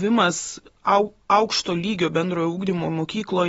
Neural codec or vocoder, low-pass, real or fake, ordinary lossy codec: none; 9.9 kHz; real; AAC, 24 kbps